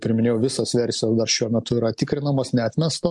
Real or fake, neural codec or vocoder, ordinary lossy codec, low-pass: real; none; MP3, 64 kbps; 10.8 kHz